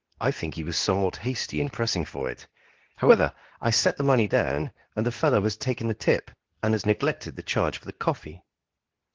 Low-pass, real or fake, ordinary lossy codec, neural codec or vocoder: 7.2 kHz; fake; Opus, 16 kbps; codec, 24 kHz, 0.9 kbps, WavTokenizer, medium speech release version 2